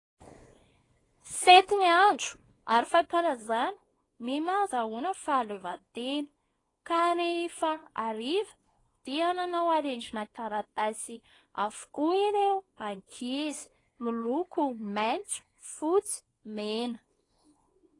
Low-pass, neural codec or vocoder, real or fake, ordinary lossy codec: 10.8 kHz; codec, 24 kHz, 0.9 kbps, WavTokenizer, small release; fake; AAC, 32 kbps